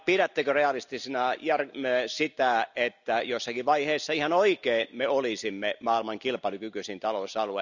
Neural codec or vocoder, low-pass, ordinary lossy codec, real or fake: none; 7.2 kHz; none; real